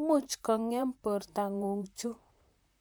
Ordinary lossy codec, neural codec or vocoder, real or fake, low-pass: none; vocoder, 44.1 kHz, 128 mel bands, Pupu-Vocoder; fake; none